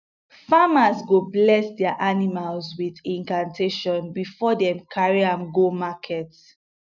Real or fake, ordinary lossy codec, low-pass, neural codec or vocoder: real; none; 7.2 kHz; none